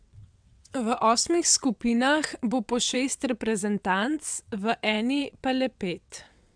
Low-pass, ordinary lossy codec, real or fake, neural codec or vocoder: 9.9 kHz; MP3, 96 kbps; fake; vocoder, 22.05 kHz, 80 mel bands, WaveNeXt